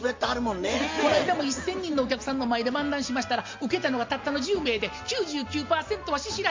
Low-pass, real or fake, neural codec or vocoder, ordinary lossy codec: 7.2 kHz; real; none; MP3, 48 kbps